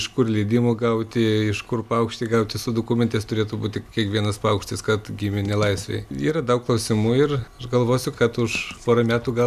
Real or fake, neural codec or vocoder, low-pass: real; none; 14.4 kHz